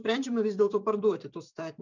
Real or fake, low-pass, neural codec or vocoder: fake; 7.2 kHz; vocoder, 44.1 kHz, 128 mel bands every 512 samples, BigVGAN v2